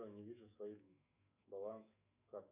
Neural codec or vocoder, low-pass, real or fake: autoencoder, 48 kHz, 128 numbers a frame, DAC-VAE, trained on Japanese speech; 3.6 kHz; fake